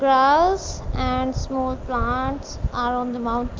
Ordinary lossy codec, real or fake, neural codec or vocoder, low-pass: Opus, 32 kbps; real; none; 7.2 kHz